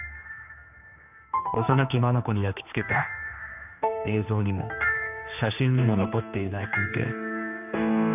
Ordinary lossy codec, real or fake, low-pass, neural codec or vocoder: none; fake; 3.6 kHz; codec, 16 kHz, 2 kbps, X-Codec, HuBERT features, trained on general audio